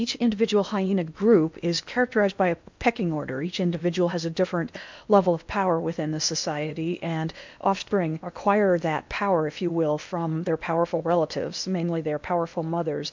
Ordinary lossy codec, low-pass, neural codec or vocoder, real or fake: MP3, 64 kbps; 7.2 kHz; codec, 16 kHz in and 24 kHz out, 0.8 kbps, FocalCodec, streaming, 65536 codes; fake